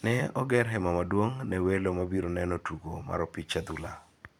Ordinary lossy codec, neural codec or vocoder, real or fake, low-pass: none; vocoder, 44.1 kHz, 128 mel bands every 256 samples, BigVGAN v2; fake; 19.8 kHz